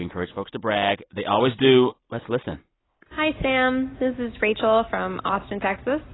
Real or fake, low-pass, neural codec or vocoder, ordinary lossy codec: real; 7.2 kHz; none; AAC, 16 kbps